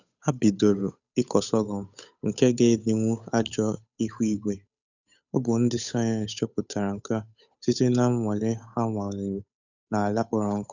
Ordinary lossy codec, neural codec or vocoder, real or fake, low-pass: none; codec, 16 kHz, 8 kbps, FunCodec, trained on Chinese and English, 25 frames a second; fake; 7.2 kHz